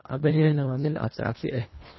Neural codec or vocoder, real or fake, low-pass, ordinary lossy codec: codec, 24 kHz, 1.5 kbps, HILCodec; fake; 7.2 kHz; MP3, 24 kbps